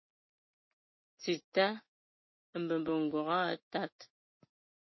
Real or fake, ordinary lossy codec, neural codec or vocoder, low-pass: real; MP3, 24 kbps; none; 7.2 kHz